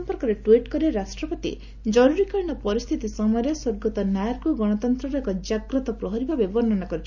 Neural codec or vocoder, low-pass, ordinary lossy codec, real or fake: none; 7.2 kHz; none; real